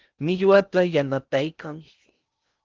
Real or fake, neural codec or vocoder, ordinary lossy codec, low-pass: fake; codec, 16 kHz in and 24 kHz out, 0.6 kbps, FocalCodec, streaming, 4096 codes; Opus, 32 kbps; 7.2 kHz